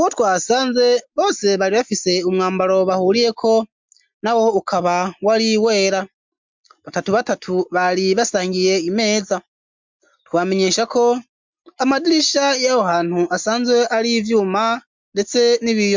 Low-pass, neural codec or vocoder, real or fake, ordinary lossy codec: 7.2 kHz; none; real; MP3, 64 kbps